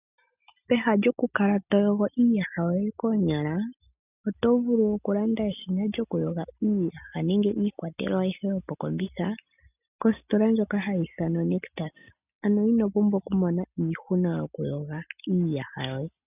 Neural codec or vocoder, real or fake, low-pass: none; real; 3.6 kHz